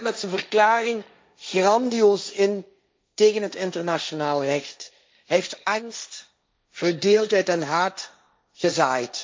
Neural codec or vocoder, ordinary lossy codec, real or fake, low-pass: codec, 16 kHz, 1.1 kbps, Voila-Tokenizer; none; fake; none